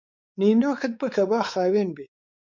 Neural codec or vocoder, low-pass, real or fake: codec, 16 kHz, 4 kbps, X-Codec, WavLM features, trained on Multilingual LibriSpeech; 7.2 kHz; fake